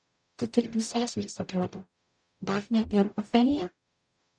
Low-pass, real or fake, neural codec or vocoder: 9.9 kHz; fake; codec, 44.1 kHz, 0.9 kbps, DAC